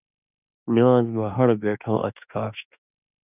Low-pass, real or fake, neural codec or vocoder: 3.6 kHz; fake; autoencoder, 48 kHz, 32 numbers a frame, DAC-VAE, trained on Japanese speech